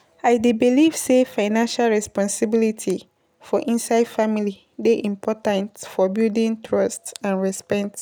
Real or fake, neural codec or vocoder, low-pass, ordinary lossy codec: real; none; none; none